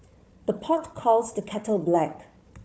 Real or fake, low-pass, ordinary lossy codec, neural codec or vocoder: fake; none; none; codec, 16 kHz, 4 kbps, FunCodec, trained on Chinese and English, 50 frames a second